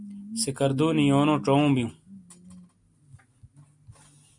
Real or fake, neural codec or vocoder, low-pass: real; none; 10.8 kHz